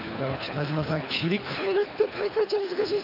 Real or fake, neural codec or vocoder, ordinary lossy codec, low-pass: fake; codec, 24 kHz, 3 kbps, HILCodec; none; 5.4 kHz